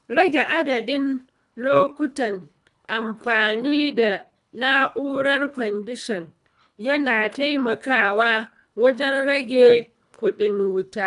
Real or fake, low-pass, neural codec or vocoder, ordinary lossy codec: fake; 10.8 kHz; codec, 24 kHz, 1.5 kbps, HILCodec; none